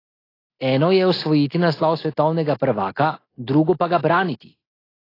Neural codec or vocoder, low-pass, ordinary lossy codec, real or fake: none; 5.4 kHz; AAC, 24 kbps; real